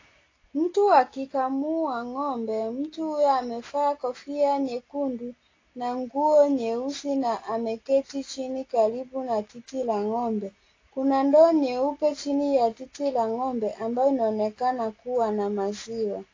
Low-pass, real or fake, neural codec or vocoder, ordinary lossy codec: 7.2 kHz; real; none; AAC, 32 kbps